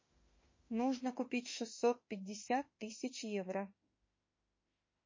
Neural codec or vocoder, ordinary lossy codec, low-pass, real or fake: autoencoder, 48 kHz, 32 numbers a frame, DAC-VAE, trained on Japanese speech; MP3, 32 kbps; 7.2 kHz; fake